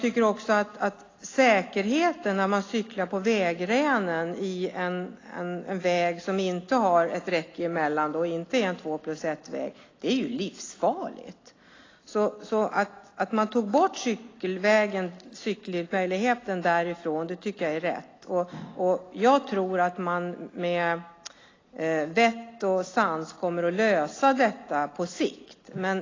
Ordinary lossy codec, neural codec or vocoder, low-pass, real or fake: AAC, 32 kbps; none; 7.2 kHz; real